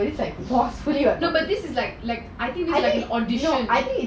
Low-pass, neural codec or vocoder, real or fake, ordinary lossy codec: none; none; real; none